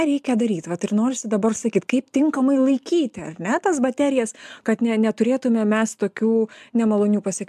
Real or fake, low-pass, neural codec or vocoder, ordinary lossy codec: real; 14.4 kHz; none; MP3, 96 kbps